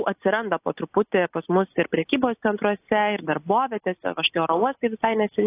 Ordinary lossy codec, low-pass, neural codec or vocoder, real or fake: AAC, 24 kbps; 3.6 kHz; none; real